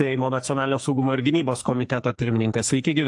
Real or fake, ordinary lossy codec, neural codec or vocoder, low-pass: fake; AAC, 64 kbps; codec, 44.1 kHz, 2.6 kbps, SNAC; 10.8 kHz